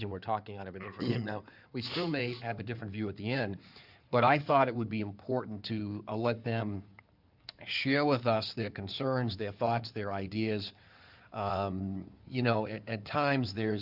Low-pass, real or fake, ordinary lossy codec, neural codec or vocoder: 5.4 kHz; fake; Opus, 64 kbps; codec, 16 kHz in and 24 kHz out, 2.2 kbps, FireRedTTS-2 codec